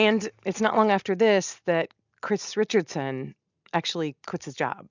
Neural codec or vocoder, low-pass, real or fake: none; 7.2 kHz; real